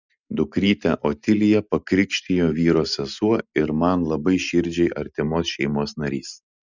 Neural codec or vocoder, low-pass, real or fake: none; 7.2 kHz; real